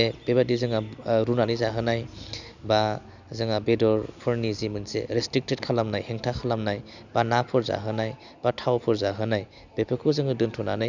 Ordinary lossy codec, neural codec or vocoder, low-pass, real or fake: none; none; 7.2 kHz; real